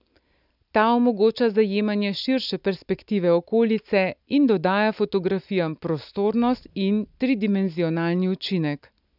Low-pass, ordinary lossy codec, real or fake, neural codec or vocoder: 5.4 kHz; none; real; none